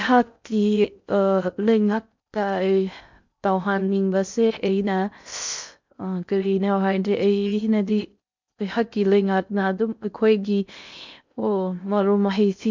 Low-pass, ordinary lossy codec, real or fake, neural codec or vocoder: 7.2 kHz; MP3, 64 kbps; fake; codec, 16 kHz in and 24 kHz out, 0.6 kbps, FocalCodec, streaming, 4096 codes